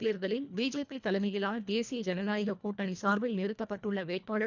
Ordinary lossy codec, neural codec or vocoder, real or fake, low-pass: none; codec, 24 kHz, 1.5 kbps, HILCodec; fake; 7.2 kHz